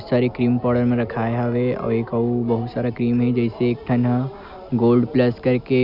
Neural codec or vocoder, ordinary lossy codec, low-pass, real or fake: none; none; 5.4 kHz; real